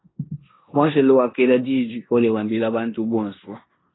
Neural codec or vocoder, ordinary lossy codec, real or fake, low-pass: codec, 16 kHz in and 24 kHz out, 0.9 kbps, LongCat-Audio-Codec, four codebook decoder; AAC, 16 kbps; fake; 7.2 kHz